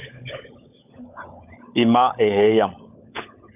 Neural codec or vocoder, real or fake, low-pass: codec, 16 kHz, 16 kbps, FunCodec, trained on LibriTTS, 50 frames a second; fake; 3.6 kHz